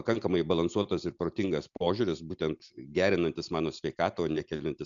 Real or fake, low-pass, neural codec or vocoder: real; 7.2 kHz; none